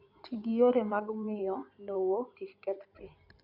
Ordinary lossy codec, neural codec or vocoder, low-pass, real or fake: none; codec, 16 kHz in and 24 kHz out, 2.2 kbps, FireRedTTS-2 codec; 5.4 kHz; fake